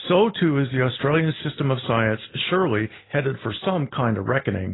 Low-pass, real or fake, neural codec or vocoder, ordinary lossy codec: 7.2 kHz; real; none; AAC, 16 kbps